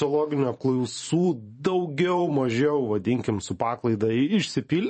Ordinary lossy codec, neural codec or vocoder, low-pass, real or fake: MP3, 32 kbps; vocoder, 44.1 kHz, 128 mel bands every 512 samples, BigVGAN v2; 10.8 kHz; fake